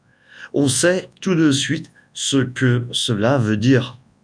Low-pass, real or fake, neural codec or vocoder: 9.9 kHz; fake; codec, 24 kHz, 0.9 kbps, WavTokenizer, large speech release